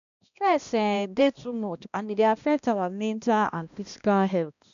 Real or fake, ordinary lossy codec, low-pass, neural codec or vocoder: fake; none; 7.2 kHz; codec, 16 kHz, 1 kbps, X-Codec, HuBERT features, trained on balanced general audio